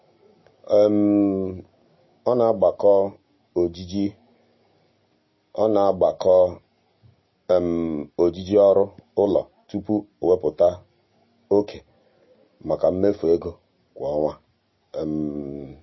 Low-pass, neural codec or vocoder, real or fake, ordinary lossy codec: 7.2 kHz; none; real; MP3, 24 kbps